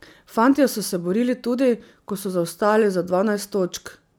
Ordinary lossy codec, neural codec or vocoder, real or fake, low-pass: none; none; real; none